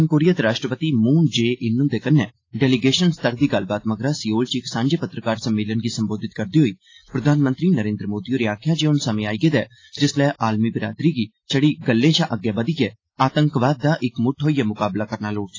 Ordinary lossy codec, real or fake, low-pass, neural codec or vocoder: AAC, 32 kbps; real; 7.2 kHz; none